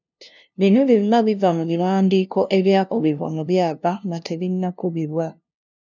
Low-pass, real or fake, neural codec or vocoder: 7.2 kHz; fake; codec, 16 kHz, 0.5 kbps, FunCodec, trained on LibriTTS, 25 frames a second